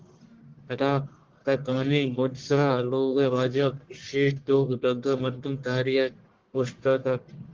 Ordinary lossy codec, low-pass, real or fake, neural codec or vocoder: Opus, 16 kbps; 7.2 kHz; fake; codec, 44.1 kHz, 1.7 kbps, Pupu-Codec